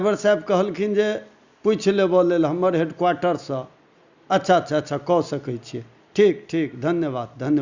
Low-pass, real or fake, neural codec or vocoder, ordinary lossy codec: 7.2 kHz; real; none; Opus, 64 kbps